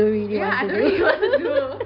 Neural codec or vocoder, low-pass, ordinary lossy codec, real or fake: none; 5.4 kHz; none; real